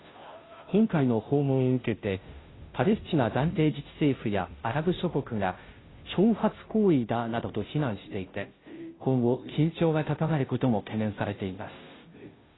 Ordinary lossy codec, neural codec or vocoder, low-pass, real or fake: AAC, 16 kbps; codec, 16 kHz, 0.5 kbps, FunCodec, trained on Chinese and English, 25 frames a second; 7.2 kHz; fake